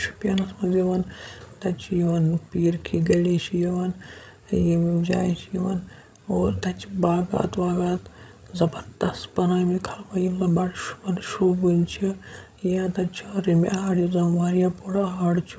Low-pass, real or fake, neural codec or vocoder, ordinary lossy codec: none; fake; codec, 16 kHz, 16 kbps, FreqCodec, larger model; none